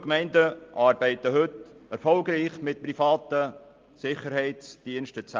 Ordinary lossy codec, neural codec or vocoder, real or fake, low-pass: Opus, 32 kbps; none; real; 7.2 kHz